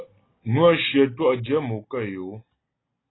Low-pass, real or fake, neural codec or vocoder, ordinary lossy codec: 7.2 kHz; real; none; AAC, 16 kbps